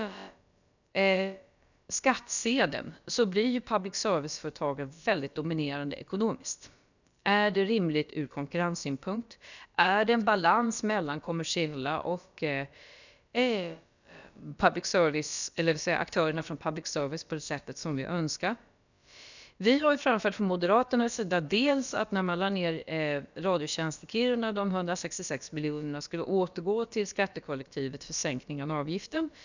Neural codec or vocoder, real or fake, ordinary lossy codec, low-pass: codec, 16 kHz, about 1 kbps, DyCAST, with the encoder's durations; fake; none; 7.2 kHz